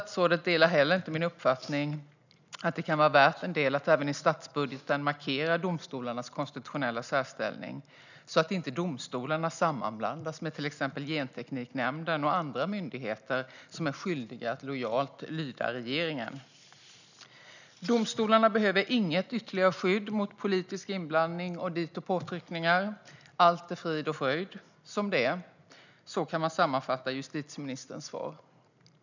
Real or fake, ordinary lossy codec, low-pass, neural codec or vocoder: real; none; 7.2 kHz; none